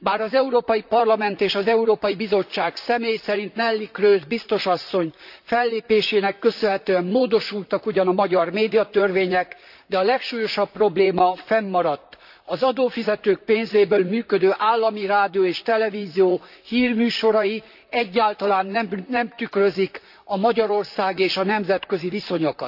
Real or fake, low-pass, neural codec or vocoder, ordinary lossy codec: fake; 5.4 kHz; vocoder, 44.1 kHz, 128 mel bands, Pupu-Vocoder; AAC, 48 kbps